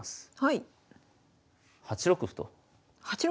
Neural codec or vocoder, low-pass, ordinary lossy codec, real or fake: none; none; none; real